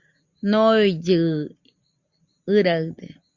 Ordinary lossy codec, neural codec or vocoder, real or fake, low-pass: Opus, 64 kbps; none; real; 7.2 kHz